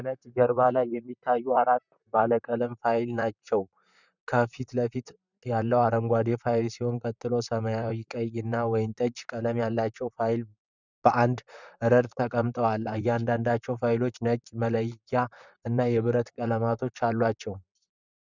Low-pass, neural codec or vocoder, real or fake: 7.2 kHz; vocoder, 44.1 kHz, 128 mel bands, Pupu-Vocoder; fake